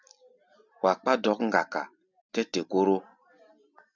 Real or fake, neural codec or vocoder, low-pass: real; none; 7.2 kHz